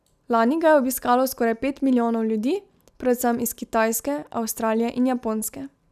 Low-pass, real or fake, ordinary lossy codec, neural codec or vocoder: 14.4 kHz; real; none; none